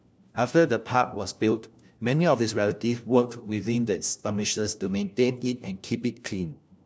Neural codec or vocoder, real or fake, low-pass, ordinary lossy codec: codec, 16 kHz, 1 kbps, FunCodec, trained on LibriTTS, 50 frames a second; fake; none; none